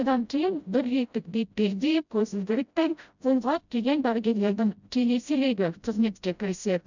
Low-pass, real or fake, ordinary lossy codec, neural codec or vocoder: 7.2 kHz; fake; none; codec, 16 kHz, 0.5 kbps, FreqCodec, smaller model